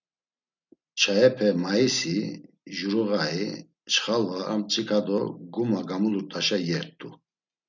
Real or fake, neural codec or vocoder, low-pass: real; none; 7.2 kHz